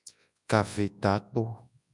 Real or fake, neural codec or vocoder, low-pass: fake; codec, 24 kHz, 0.9 kbps, WavTokenizer, large speech release; 10.8 kHz